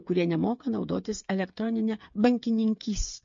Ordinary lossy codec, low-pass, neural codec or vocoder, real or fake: MP3, 48 kbps; 7.2 kHz; codec, 16 kHz, 8 kbps, FreqCodec, smaller model; fake